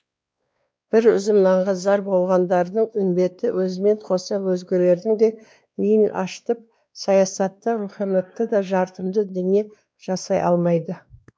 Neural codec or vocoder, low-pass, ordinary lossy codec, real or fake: codec, 16 kHz, 2 kbps, X-Codec, WavLM features, trained on Multilingual LibriSpeech; none; none; fake